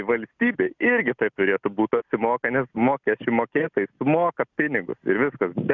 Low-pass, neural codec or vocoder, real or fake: 7.2 kHz; none; real